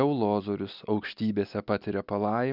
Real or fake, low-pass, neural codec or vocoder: real; 5.4 kHz; none